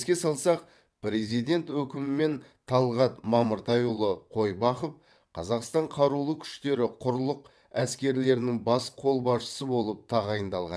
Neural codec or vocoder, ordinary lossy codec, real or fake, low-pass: vocoder, 22.05 kHz, 80 mel bands, WaveNeXt; none; fake; none